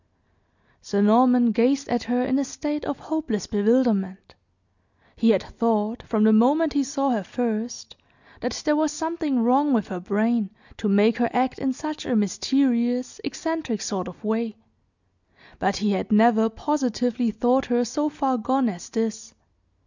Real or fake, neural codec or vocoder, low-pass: real; none; 7.2 kHz